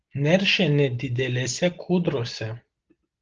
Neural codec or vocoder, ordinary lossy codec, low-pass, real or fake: none; Opus, 16 kbps; 7.2 kHz; real